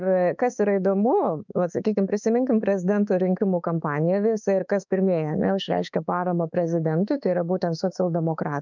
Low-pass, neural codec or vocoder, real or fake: 7.2 kHz; autoencoder, 48 kHz, 32 numbers a frame, DAC-VAE, trained on Japanese speech; fake